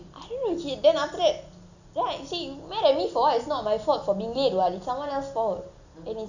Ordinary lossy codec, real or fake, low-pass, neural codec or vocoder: none; real; 7.2 kHz; none